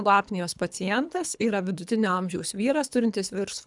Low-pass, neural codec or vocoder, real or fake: 10.8 kHz; codec, 24 kHz, 3 kbps, HILCodec; fake